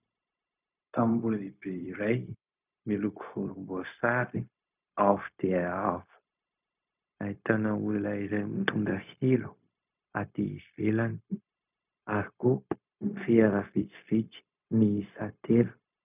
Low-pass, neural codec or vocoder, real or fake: 3.6 kHz; codec, 16 kHz, 0.4 kbps, LongCat-Audio-Codec; fake